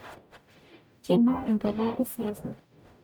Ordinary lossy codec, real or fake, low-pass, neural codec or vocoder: none; fake; none; codec, 44.1 kHz, 0.9 kbps, DAC